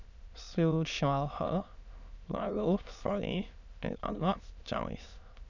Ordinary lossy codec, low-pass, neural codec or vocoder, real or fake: none; 7.2 kHz; autoencoder, 22.05 kHz, a latent of 192 numbers a frame, VITS, trained on many speakers; fake